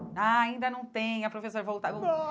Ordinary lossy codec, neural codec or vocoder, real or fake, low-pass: none; none; real; none